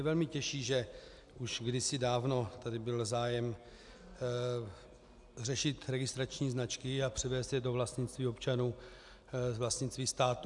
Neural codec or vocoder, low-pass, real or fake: none; 10.8 kHz; real